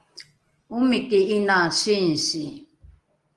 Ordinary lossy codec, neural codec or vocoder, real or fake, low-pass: Opus, 24 kbps; none; real; 10.8 kHz